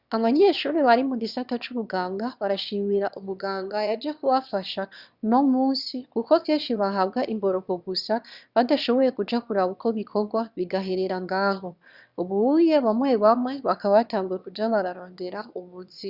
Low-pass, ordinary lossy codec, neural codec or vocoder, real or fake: 5.4 kHz; Opus, 64 kbps; autoencoder, 22.05 kHz, a latent of 192 numbers a frame, VITS, trained on one speaker; fake